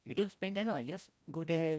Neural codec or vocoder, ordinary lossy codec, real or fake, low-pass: codec, 16 kHz, 1 kbps, FreqCodec, larger model; none; fake; none